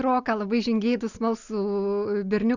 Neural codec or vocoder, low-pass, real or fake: none; 7.2 kHz; real